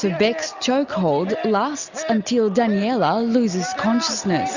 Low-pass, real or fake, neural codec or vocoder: 7.2 kHz; real; none